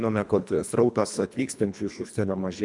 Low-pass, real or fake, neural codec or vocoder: 10.8 kHz; fake; codec, 24 kHz, 1.5 kbps, HILCodec